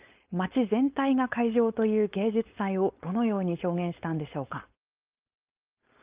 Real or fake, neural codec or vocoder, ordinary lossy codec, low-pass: fake; codec, 16 kHz, 4.8 kbps, FACodec; Opus, 24 kbps; 3.6 kHz